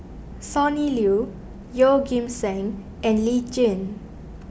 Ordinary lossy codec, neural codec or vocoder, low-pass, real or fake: none; none; none; real